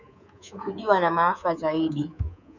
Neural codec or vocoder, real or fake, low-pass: codec, 24 kHz, 3.1 kbps, DualCodec; fake; 7.2 kHz